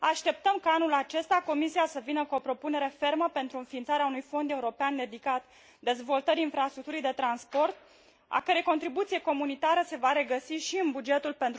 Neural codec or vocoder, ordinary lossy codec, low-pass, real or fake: none; none; none; real